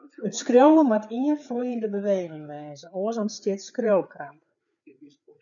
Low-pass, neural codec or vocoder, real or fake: 7.2 kHz; codec, 16 kHz, 4 kbps, FreqCodec, larger model; fake